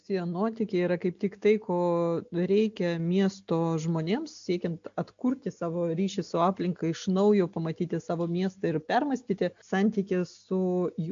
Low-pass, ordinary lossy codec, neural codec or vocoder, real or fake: 7.2 kHz; AAC, 64 kbps; none; real